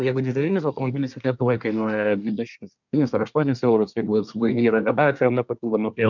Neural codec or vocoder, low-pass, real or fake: codec, 24 kHz, 1 kbps, SNAC; 7.2 kHz; fake